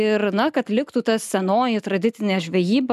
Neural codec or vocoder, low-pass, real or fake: none; 14.4 kHz; real